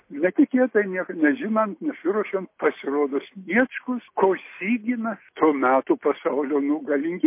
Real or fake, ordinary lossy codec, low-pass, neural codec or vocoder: real; MP3, 24 kbps; 3.6 kHz; none